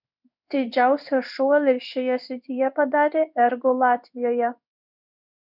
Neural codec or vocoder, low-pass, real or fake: codec, 16 kHz in and 24 kHz out, 1 kbps, XY-Tokenizer; 5.4 kHz; fake